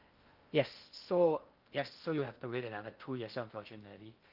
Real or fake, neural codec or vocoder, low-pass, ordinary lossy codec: fake; codec, 16 kHz in and 24 kHz out, 0.6 kbps, FocalCodec, streaming, 2048 codes; 5.4 kHz; Opus, 24 kbps